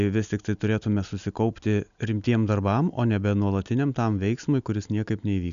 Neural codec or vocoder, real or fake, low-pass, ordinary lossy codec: none; real; 7.2 kHz; MP3, 96 kbps